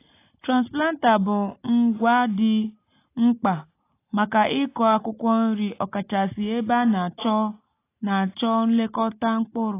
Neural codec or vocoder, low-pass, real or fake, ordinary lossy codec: none; 3.6 kHz; real; AAC, 24 kbps